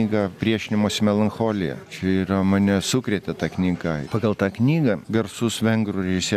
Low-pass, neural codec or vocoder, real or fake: 14.4 kHz; none; real